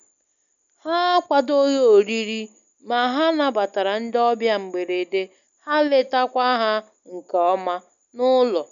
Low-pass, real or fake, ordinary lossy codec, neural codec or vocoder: 7.2 kHz; real; none; none